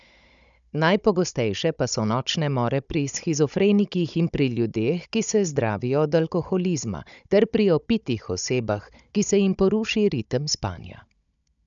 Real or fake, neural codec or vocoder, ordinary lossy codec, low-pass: fake; codec, 16 kHz, 16 kbps, FunCodec, trained on Chinese and English, 50 frames a second; none; 7.2 kHz